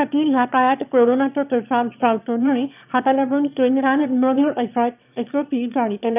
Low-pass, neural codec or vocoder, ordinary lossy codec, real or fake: 3.6 kHz; autoencoder, 22.05 kHz, a latent of 192 numbers a frame, VITS, trained on one speaker; none; fake